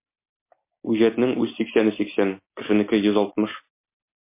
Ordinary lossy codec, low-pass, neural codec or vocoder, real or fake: MP3, 24 kbps; 3.6 kHz; none; real